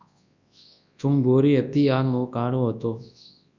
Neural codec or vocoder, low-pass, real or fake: codec, 24 kHz, 0.9 kbps, WavTokenizer, large speech release; 7.2 kHz; fake